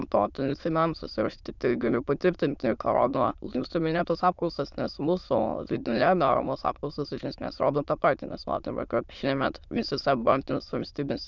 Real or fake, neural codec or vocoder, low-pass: fake; autoencoder, 22.05 kHz, a latent of 192 numbers a frame, VITS, trained on many speakers; 7.2 kHz